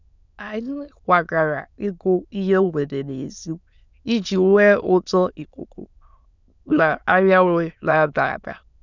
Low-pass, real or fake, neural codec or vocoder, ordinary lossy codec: 7.2 kHz; fake; autoencoder, 22.05 kHz, a latent of 192 numbers a frame, VITS, trained on many speakers; none